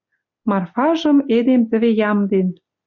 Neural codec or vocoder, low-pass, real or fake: none; 7.2 kHz; real